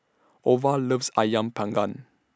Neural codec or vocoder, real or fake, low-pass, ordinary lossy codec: none; real; none; none